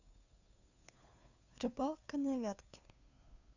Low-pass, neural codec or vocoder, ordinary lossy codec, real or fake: 7.2 kHz; codec, 16 kHz, 2 kbps, FunCodec, trained on Chinese and English, 25 frames a second; none; fake